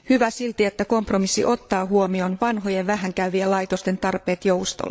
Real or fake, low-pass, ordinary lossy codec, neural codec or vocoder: fake; none; none; codec, 16 kHz, 8 kbps, FreqCodec, larger model